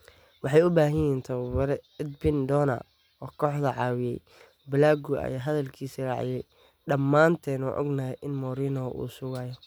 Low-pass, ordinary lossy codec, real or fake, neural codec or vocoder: none; none; real; none